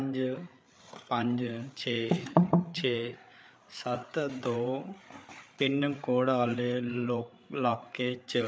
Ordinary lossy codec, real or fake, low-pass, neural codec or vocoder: none; fake; none; codec, 16 kHz, 8 kbps, FreqCodec, larger model